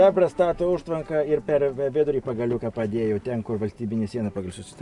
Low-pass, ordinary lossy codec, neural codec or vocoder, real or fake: 10.8 kHz; AAC, 64 kbps; none; real